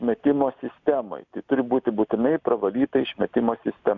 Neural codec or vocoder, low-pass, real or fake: none; 7.2 kHz; real